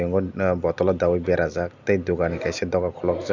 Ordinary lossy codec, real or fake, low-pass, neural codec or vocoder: none; real; 7.2 kHz; none